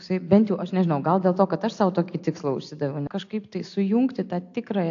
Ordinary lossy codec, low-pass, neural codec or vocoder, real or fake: AAC, 48 kbps; 7.2 kHz; none; real